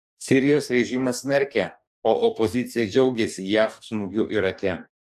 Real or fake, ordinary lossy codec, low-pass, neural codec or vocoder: fake; MP3, 96 kbps; 14.4 kHz; codec, 44.1 kHz, 2.6 kbps, DAC